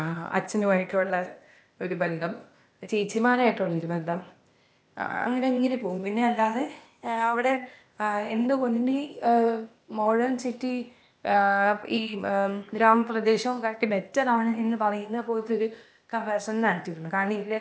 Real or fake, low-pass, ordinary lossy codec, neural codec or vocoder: fake; none; none; codec, 16 kHz, 0.8 kbps, ZipCodec